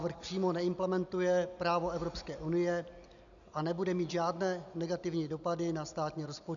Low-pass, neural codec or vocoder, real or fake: 7.2 kHz; none; real